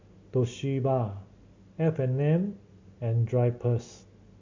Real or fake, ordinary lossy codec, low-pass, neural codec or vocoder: real; MP3, 48 kbps; 7.2 kHz; none